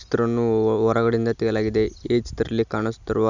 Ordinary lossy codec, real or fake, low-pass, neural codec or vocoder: none; real; 7.2 kHz; none